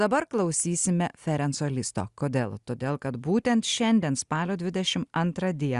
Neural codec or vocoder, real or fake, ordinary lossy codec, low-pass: none; real; Opus, 64 kbps; 10.8 kHz